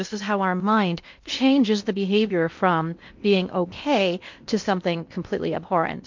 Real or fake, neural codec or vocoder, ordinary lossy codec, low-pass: fake; codec, 16 kHz in and 24 kHz out, 0.8 kbps, FocalCodec, streaming, 65536 codes; MP3, 48 kbps; 7.2 kHz